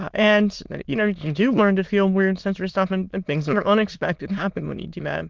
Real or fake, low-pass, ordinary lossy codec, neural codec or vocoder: fake; 7.2 kHz; Opus, 32 kbps; autoencoder, 22.05 kHz, a latent of 192 numbers a frame, VITS, trained on many speakers